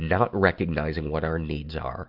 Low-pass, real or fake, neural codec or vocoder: 5.4 kHz; real; none